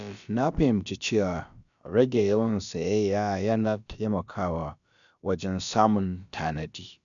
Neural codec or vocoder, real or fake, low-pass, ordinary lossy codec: codec, 16 kHz, about 1 kbps, DyCAST, with the encoder's durations; fake; 7.2 kHz; none